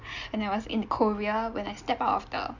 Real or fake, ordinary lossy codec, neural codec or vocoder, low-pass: real; none; none; 7.2 kHz